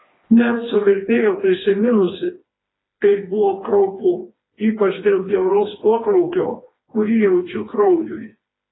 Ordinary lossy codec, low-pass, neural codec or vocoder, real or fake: AAC, 16 kbps; 7.2 kHz; codec, 16 kHz, 2 kbps, FreqCodec, smaller model; fake